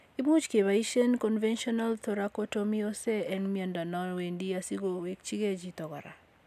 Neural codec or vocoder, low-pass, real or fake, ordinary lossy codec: none; 14.4 kHz; real; none